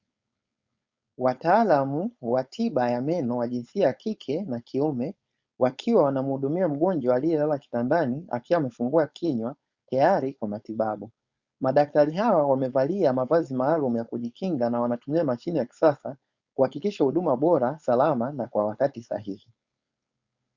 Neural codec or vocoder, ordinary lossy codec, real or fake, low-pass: codec, 16 kHz, 4.8 kbps, FACodec; Opus, 64 kbps; fake; 7.2 kHz